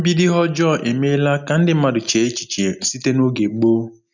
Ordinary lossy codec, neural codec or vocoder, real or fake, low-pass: none; none; real; 7.2 kHz